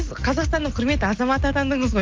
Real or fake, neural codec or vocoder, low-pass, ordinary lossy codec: real; none; 7.2 kHz; Opus, 32 kbps